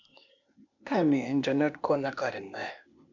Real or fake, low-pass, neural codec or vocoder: fake; 7.2 kHz; codec, 16 kHz, 0.8 kbps, ZipCodec